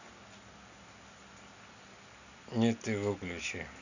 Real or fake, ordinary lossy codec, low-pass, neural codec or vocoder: real; none; 7.2 kHz; none